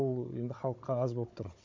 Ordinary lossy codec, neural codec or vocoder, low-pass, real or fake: MP3, 48 kbps; codec, 16 kHz, 16 kbps, FunCodec, trained on Chinese and English, 50 frames a second; 7.2 kHz; fake